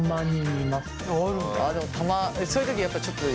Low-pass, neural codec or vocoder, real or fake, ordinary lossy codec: none; none; real; none